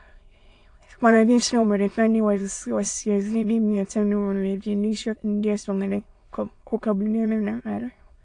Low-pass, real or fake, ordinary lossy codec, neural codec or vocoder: 9.9 kHz; fake; AAC, 48 kbps; autoencoder, 22.05 kHz, a latent of 192 numbers a frame, VITS, trained on many speakers